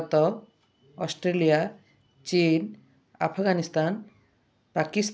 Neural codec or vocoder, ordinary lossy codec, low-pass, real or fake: none; none; none; real